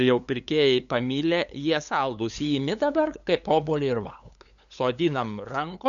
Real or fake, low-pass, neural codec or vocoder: fake; 7.2 kHz; codec, 16 kHz, 8 kbps, FunCodec, trained on LibriTTS, 25 frames a second